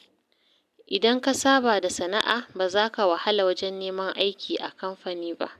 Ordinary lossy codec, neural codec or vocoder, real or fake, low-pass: none; none; real; 14.4 kHz